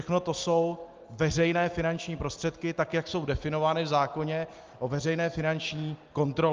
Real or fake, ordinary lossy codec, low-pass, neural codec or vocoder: real; Opus, 32 kbps; 7.2 kHz; none